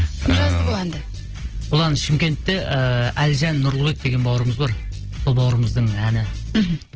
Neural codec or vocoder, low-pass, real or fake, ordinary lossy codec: none; 7.2 kHz; real; Opus, 16 kbps